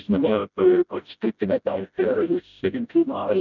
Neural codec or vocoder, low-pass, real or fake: codec, 16 kHz, 0.5 kbps, FreqCodec, smaller model; 7.2 kHz; fake